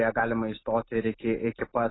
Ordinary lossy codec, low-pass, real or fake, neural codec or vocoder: AAC, 16 kbps; 7.2 kHz; real; none